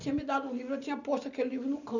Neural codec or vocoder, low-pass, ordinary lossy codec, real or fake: none; 7.2 kHz; none; real